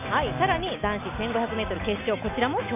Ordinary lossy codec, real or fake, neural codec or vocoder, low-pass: none; real; none; 3.6 kHz